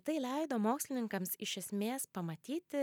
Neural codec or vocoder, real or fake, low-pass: none; real; 19.8 kHz